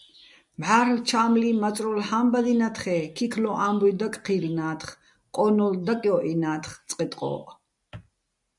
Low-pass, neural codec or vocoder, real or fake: 10.8 kHz; none; real